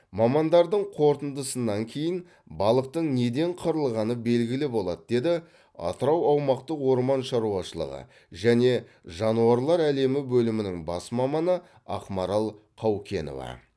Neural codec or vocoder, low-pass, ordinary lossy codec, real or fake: none; none; none; real